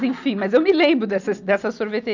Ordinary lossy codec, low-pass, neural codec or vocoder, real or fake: none; 7.2 kHz; none; real